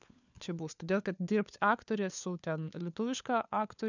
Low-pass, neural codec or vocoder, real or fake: 7.2 kHz; codec, 16 kHz, 4 kbps, FunCodec, trained on LibriTTS, 50 frames a second; fake